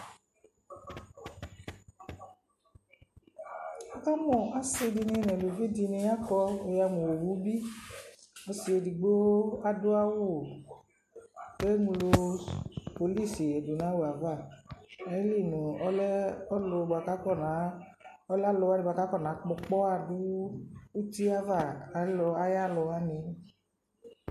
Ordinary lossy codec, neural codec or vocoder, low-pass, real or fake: MP3, 64 kbps; none; 14.4 kHz; real